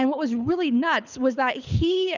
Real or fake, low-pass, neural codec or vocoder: fake; 7.2 kHz; codec, 24 kHz, 6 kbps, HILCodec